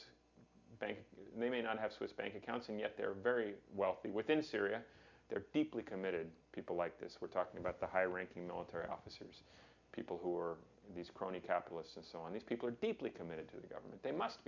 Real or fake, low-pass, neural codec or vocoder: real; 7.2 kHz; none